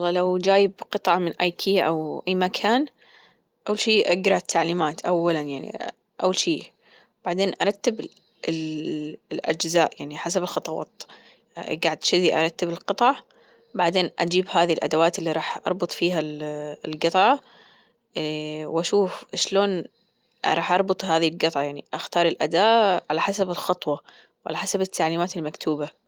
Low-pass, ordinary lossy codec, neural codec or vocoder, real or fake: 19.8 kHz; Opus, 24 kbps; none; real